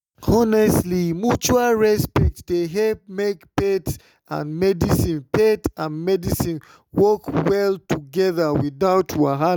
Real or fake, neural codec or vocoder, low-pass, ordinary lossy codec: real; none; none; none